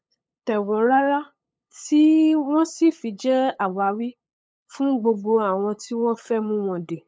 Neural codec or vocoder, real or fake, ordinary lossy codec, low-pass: codec, 16 kHz, 8 kbps, FunCodec, trained on LibriTTS, 25 frames a second; fake; none; none